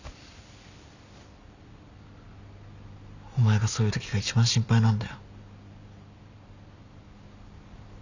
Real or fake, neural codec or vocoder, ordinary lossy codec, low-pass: real; none; none; 7.2 kHz